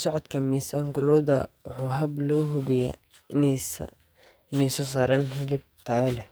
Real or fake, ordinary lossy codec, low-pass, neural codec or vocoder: fake; none; none; codec, 44.1 kHz, 2.6 kbps, SNAC